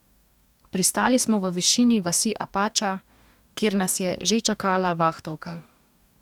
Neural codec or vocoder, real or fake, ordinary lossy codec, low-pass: codec, 44.1 kHz, 2.6 kbps, DAC; fake; none; 19.8 kHz